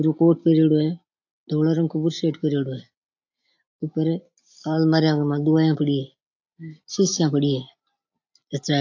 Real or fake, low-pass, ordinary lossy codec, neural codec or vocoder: real; 7.2 kHz; AAC, 48 kbps; none